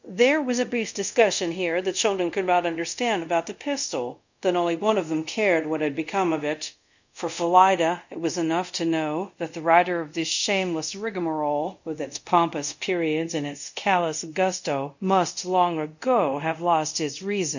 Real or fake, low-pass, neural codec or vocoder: fake; 7.2 kHz; codec, 24 kHz, 0.5 kbps, DualCodec